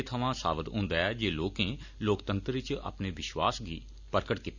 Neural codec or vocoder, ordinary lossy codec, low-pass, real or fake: none; none; 7.2 kHz; real